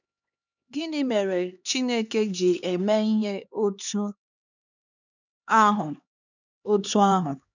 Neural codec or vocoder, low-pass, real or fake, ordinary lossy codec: codec, 16 kHz, 4 kbps, X-Codec, HuBERT features, trained on LibriSpeech; 7.2 kHz; fake; none